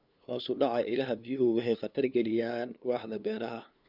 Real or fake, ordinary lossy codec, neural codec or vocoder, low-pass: fake; none; codec, 16 kHz, 2 kbps, FunCodec, trained on LibriTTS, 25 frames a second; 5.4 kHz